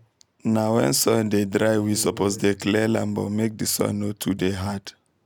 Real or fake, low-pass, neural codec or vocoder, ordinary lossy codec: real; none; none; none